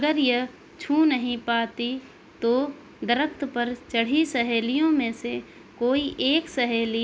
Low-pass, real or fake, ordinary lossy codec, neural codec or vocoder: none; real; none; none